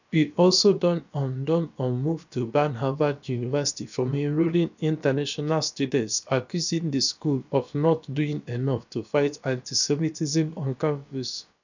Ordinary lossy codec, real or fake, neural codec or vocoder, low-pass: none; fake; codec, 16 kHz, about 1 kbps, DyCAST, with the encoder's durations; 7.2 kHz